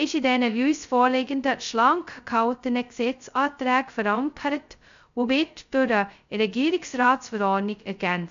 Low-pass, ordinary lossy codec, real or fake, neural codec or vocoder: 7.2 kHz; MP3, 64 kbps; fake; codec, 16 kHz, 0.2 kbps, FocalCodec